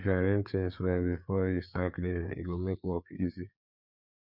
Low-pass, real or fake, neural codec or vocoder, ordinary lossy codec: 5.4 kHz; fake; codec, 16 kHz, 4 kbps, FreqCodec, larger model; none